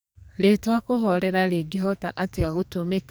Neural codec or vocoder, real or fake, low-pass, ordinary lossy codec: codec, 44.1 kHz, 2.6 kbps, SNAC; fake; none; none